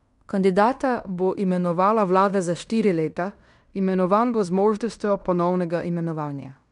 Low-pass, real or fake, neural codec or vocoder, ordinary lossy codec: 10.8 kHz; fake; codec, 16 kHz in and 24 kHz out, 0.9 kbps, LongCat-Audio-Codec, fine tuned four codebook decoder; none